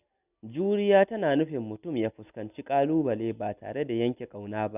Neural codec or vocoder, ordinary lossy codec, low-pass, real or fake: none; none; 3.6 kHz; real